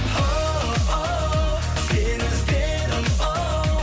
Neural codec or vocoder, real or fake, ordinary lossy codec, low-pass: none; real; none; none